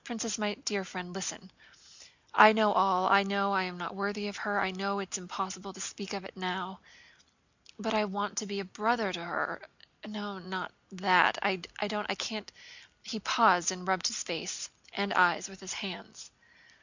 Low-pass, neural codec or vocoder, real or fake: 7.2 kHz; none; real